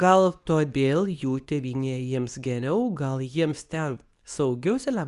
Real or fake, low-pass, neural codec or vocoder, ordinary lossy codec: fake; 10.8 kHz; codec, 24 kHz, 0.9 kbps, WavTokenizer, medium speech release version 2; Opus, 64 kbps